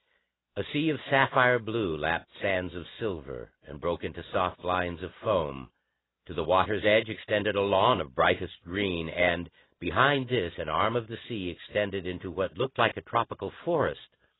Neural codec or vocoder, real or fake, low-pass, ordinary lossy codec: none; real; 7.2 kHz; AAC, 16 kbps